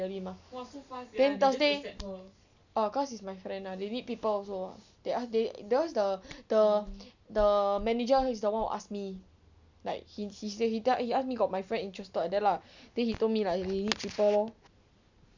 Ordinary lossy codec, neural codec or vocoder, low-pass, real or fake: none; none; 7.2 kHz; real